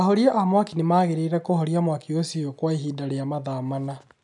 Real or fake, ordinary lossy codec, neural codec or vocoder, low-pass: real; none; none; 10.8 kHz